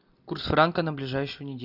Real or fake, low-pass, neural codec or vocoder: real; 5.4 kHz; none